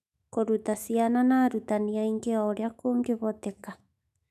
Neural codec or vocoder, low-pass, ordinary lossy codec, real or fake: autoencoder, 48 kHz, 128 numbers a frame, DAC-VAE, trained on Japanese speech; 14.4 kHz; none; fake